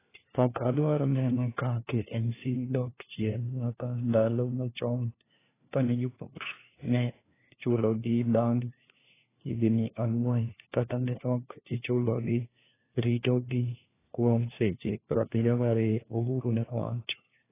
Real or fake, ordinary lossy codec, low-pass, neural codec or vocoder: fake; AAC, 16 kbps; 3.6 kHz; codec, 16 kHz, 1 kbps, FunCodec, trained on LibriTTS, 50 frames a second